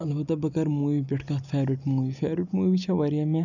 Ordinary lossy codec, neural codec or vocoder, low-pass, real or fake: none; none; 7.2 kHz; real